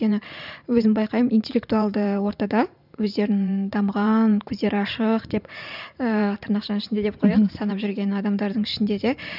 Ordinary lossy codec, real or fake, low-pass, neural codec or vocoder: none; fake; 5.4 kHz; vocoder, 44.1 kHz, 128 mel bands every 512 samples, BigVGAN v2